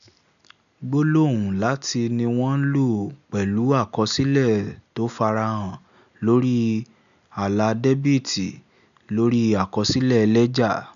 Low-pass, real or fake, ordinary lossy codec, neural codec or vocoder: 7.2 kHz; real; none; none